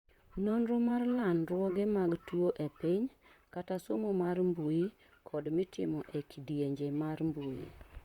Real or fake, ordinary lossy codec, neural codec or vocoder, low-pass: fake; Opus, 64 kbps; vocoder, 44.1 kHz, 128 mel bands, Pupu-Vocoder; 19.8 kHz